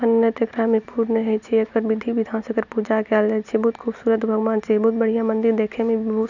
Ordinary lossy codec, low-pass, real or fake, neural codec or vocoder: none; 7.2 kHz; real; none